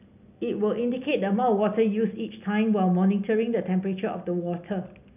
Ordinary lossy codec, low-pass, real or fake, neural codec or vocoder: none; 3.6 kHz; real; none